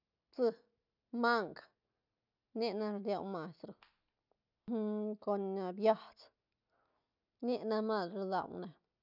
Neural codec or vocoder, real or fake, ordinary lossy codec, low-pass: none; real; none; 5.4 kHz